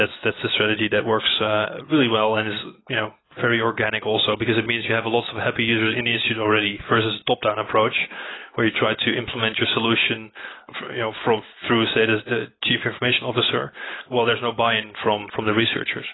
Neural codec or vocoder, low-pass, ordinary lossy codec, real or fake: none; 7.2 kHz; AAC, 16 kbps; real